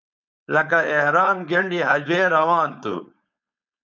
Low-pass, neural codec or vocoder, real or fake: 7.2 kHz; codec, 16 kHz, 4.8 kbps, FACodec; fake